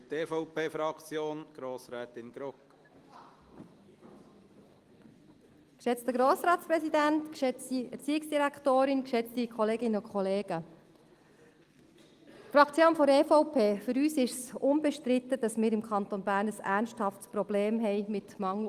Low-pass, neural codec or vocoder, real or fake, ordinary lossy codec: 14.4 kHz; none; real; Opus, 24 kbps